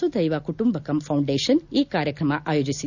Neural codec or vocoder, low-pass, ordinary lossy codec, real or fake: none; 7.2 kHz; none; real